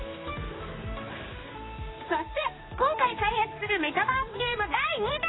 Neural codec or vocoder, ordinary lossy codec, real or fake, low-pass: codec, 16 kHz, 2 kbps, X-Codec, HuBERT features, trained on general audio; AAC, 16 kbps; fake; 7.2 kHz